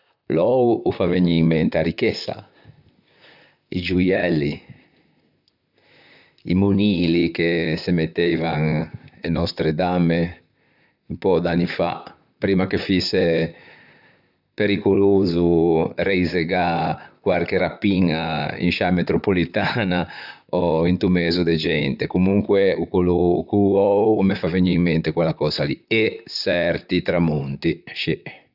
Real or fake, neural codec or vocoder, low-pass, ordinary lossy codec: fake; vocoder, 44.1 kHz, 128 mel bands, Pupu-Vocoder; 5.4 kHz; none